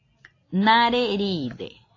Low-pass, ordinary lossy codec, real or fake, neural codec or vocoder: 7.2 kHz; AAC, 32 kbps; real; none